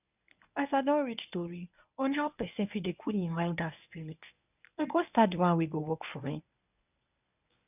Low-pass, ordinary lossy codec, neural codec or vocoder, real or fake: 3.6 kHz; none; codec, 24 kHz, 0.9 kbps, WavTokenizer, medium speech release version 1; fake